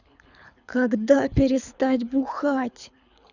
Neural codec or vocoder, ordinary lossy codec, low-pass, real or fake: codec, 24 kHz, 6 kbps, HILCodec; none; 7.2 kHz; fake